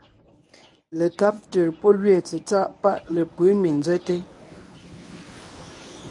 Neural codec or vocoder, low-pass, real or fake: codec, 24 kHz, 0.9 kbps, WavTokenizer, medium speech release version 1; 10.8 kHz; fake